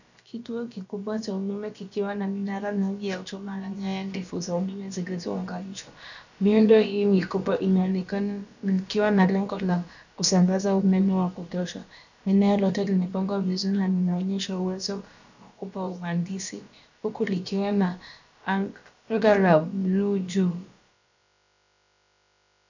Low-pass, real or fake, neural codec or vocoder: 7.2 kHz; fake; codec, 16 kHz, about 1 kbps, DyCAST, with the encoder's durations